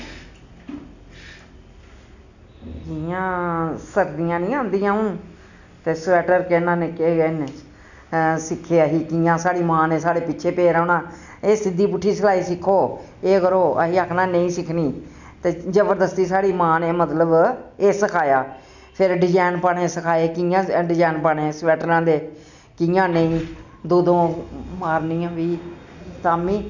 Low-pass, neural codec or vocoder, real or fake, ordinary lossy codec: 7.2 kHz; none; real; none